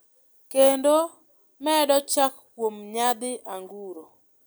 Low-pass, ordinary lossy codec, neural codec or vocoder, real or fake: none; none; none; real